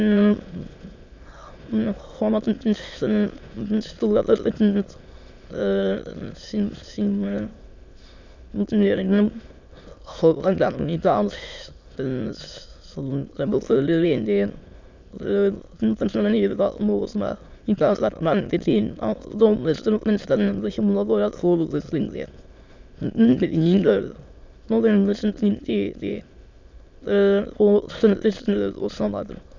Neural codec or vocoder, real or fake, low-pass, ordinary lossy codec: autoencoder, 22.05 kHz, a latent of 192 numbers a frame, VITS, trained on many speakers; fake; 7.2 kHz; MP3, 64 kbps